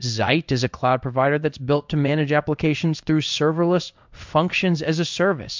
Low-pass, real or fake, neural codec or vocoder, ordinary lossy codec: 7.2 kHz; fake; codec, 16 kHz in and 24 kHz out, 1 kbps, XY-Tokenizer; MP3, 64 kbps